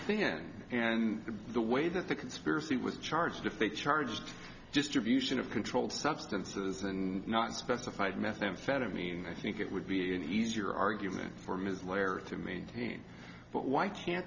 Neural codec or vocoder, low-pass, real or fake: none; 7.2 kHz; real